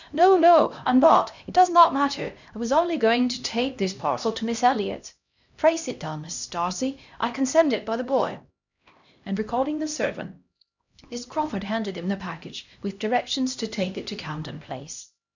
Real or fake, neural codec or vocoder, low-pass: fake; codec, 16 kHz, 1 kbps, X-Codec, HuBERT features, trained on LibriSpeech; 7.2 kHz